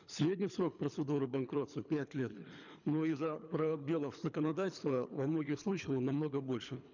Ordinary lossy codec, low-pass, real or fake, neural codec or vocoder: none; 7.2 kHz; fake; codec, 24 kHz, 3 kbps, HILCodec